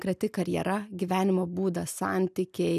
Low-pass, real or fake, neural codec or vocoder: 14.4 kHz; real; none